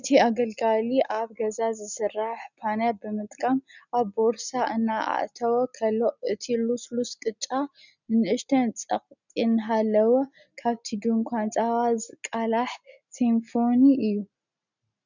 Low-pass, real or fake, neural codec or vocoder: 7.2 kHz; real; none